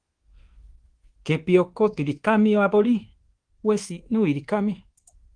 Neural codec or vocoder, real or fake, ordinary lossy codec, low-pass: codec, 24 kHz, 1.2 kbps, DualCodec; fake; Opus, 16 kbps; 9.9 kHz